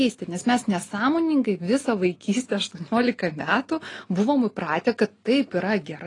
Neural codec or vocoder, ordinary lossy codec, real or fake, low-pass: none; AAC, 32 kbps; real; 10.8 kHz